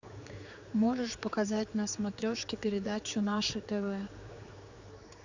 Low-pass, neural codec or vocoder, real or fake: 7.2 kHz; codec, 16 kHz, 4 kbps, X-Codec, HuBERT features, trained on general audio; fake